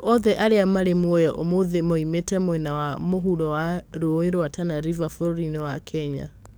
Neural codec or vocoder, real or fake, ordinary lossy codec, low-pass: codec, 44.1 kHz, 7.8 kbps, DAC; fake; none; none